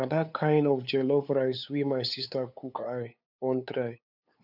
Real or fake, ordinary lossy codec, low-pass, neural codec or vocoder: fake; none; 5.4 kHz; codec, 16 kHz, 8 kbps, FunCodec, trained on LibriTTS, 25 frames a second